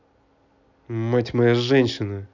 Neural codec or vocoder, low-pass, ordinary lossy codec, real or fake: none; 7.2 kHz; none; real